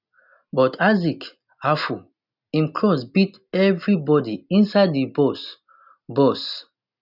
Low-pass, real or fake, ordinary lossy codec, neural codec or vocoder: 5.4 kHz; real; none; none